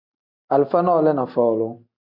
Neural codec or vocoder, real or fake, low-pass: none; real; 5.4 kHz